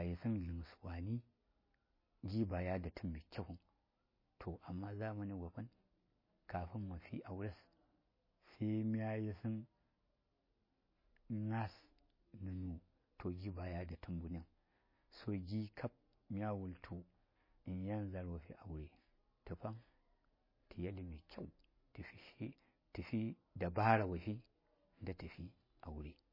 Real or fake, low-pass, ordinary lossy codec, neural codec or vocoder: real; 5.4 kHz; MP3, 24 kbps; none